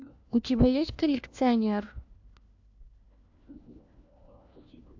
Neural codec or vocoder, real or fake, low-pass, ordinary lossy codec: codec, 16 kHz, 1 kbps, FunCodec, trained on LibriTTS, 50 frames a second; fake; 7.2 kHz; AAC, 48 kbps